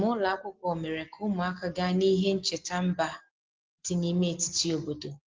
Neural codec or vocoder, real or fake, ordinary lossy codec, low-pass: none; real; Opus, 16 kbps; 7.2 kHz